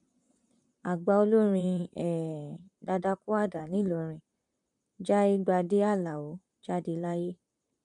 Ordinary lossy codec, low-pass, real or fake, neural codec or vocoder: none; 10.8 kHz; fake; vocoder, 44.1 kHz, 128 mel bands, Pupu-Vocoder